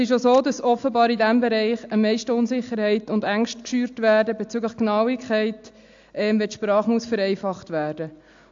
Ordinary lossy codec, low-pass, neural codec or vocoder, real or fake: AAC, 64 kbps; 7.2 kHz; none; real